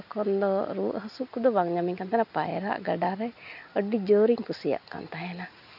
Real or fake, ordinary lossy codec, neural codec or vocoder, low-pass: real; none; none; 5.4 kHz